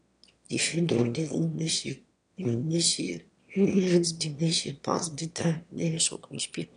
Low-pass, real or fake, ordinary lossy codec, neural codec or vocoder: 9.9 kHz; fake; none; autoencoder, 22.05 kHz, a latent of 192 numbers a frame, VITS, trained on one speaker